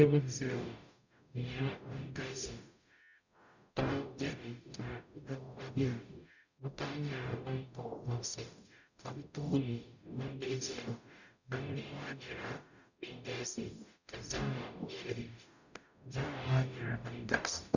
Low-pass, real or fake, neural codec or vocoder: 7.2 kHz; fake; codec, 44.1 kHz, 0.9 kbps, DAC